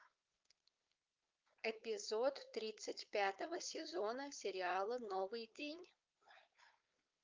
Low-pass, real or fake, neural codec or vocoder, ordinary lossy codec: 7.2 kHz; fake; codec, 16 kHz, 4.8 kbps, FACodec; Opus, 24 kbps